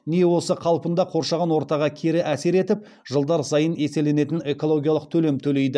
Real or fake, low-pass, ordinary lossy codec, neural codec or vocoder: real; none; none; none